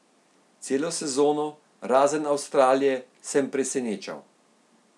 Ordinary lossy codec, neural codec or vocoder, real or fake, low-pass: none; none; real; none